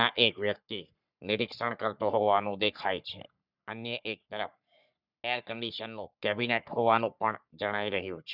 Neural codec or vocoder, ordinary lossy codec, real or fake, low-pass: codec, 44.1 kHz, 3.4 kbps, Pupu-Codec; none; fake; 5.4 kHz